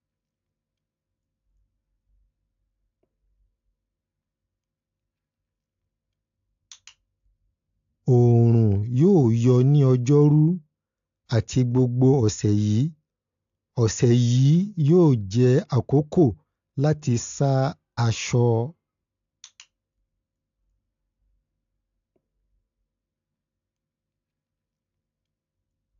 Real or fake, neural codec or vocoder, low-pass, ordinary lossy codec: real; none; 7.2 kHz; AAC, 64 kbps